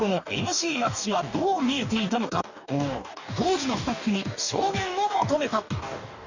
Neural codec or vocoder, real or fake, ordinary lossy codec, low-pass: codec, 44.1 kHz, 2.6 kbps, DAC; fake; none; 7.2 kHz